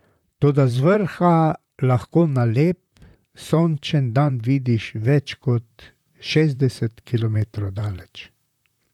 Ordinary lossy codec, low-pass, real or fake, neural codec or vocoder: none; 19.8 kHz; fake; vocoder, 44.1 kHz, 128 mel bands, Pupu-Vocoder